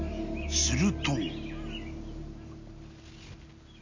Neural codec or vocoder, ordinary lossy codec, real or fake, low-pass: none; MP3, 64 kbps; real; 7.2 kHz